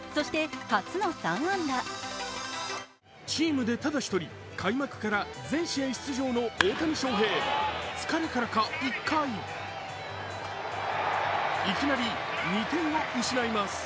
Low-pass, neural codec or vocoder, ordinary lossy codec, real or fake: none; none; none; real